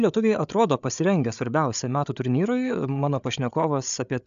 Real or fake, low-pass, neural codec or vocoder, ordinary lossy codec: fake; 7.2 kHz; codec, 16 kHz, 8 kbps, FreqCodec, larger model; AAC, 96 kbps